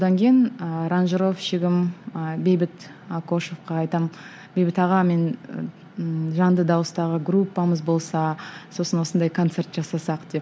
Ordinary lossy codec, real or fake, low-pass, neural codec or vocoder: none; real; none; none